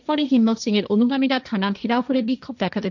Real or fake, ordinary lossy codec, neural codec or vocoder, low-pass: fake; none; codec, 16 kHz, 1.1 kbps, Voila-Tokenizer; 7.2 kHz